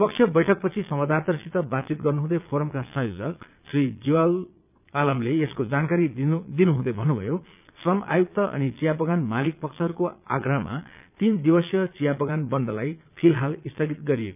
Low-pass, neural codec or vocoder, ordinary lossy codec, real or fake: 3.6 kHz; vocoder, 22.05 kHz, 80 mel bands, Vocos; none; fake